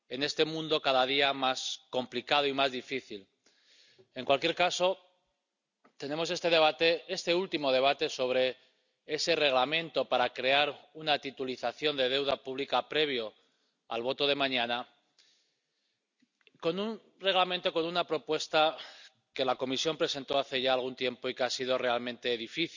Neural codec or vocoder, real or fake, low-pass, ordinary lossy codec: none; real; 7.2 kHz; none